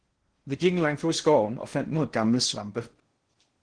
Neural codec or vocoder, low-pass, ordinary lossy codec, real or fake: codec, 16 kHz in and 24 kHz out, 0.6 kbps, FocalCodec, streaming, 2048 codes; 9.9 kHz; Opus, 16 kbps; fake